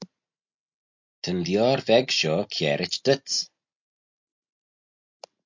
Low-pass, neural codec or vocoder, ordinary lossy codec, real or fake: 7.2 kHz; none; MP3, 64 kbps; real